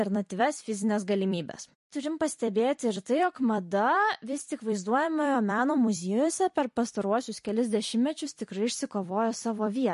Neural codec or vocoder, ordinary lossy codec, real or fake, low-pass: vocoder, 44.1 kHz, 128 mel bands every 256 samples, BigVGAN v2; MP3, 48 kbps; fake; 14.4 kHz